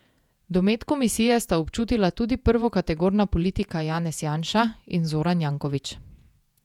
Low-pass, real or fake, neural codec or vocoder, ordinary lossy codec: 19.8 kHz; fake; vocoder, 48 kHz, 128 mel bands, Vocos; none